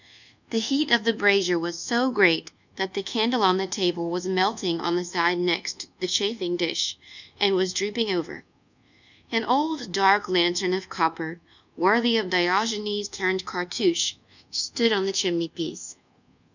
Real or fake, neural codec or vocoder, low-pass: fake; codec, 24 kHz, 1.2 kbps, DualCodec; 7.2 kHz